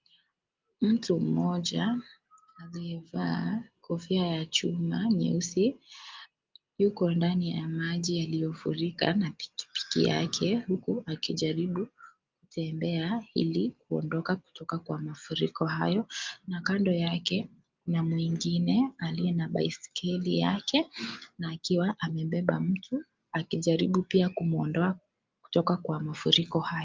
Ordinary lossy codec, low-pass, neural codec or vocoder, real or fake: Opus, 32 kbps; 7.2 kHz; none; real